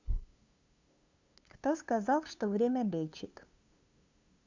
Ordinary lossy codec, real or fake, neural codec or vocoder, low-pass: Opus, 64 kbps; fake; codec, 16 kHz, 2 kbps, FunCodec, trained on LibriTTS, 25 frames a second; 7.2 kHz